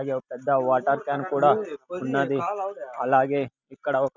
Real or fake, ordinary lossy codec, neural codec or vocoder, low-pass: real; none; none; 7.2 kHz